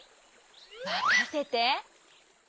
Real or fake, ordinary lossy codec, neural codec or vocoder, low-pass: real; none; none; none